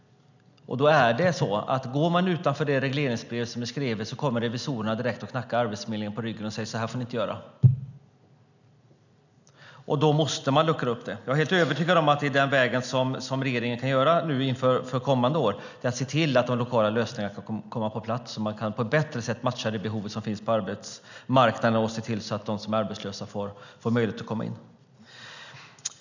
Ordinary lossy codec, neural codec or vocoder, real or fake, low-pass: none; none; real; 7.2 kHz